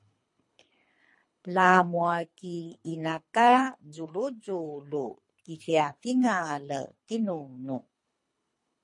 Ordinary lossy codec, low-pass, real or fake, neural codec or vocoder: MP3, 48 kbps; 10.8 kHz; fake; codec, 24 kHz, 3 kbps, HILCodec